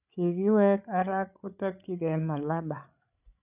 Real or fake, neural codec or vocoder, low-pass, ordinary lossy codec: fake; codec, 16 kHz, 8 kbps, FreqCodec, larger model; 3.6 kHz; none